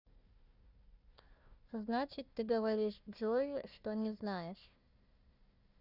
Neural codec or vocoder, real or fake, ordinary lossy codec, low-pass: codec, 16 kHz, 1 kbps, FunCodec, trained on Chinese and English, 50 frames a second; fake; Opus, 64 kbps; 5.4 kHz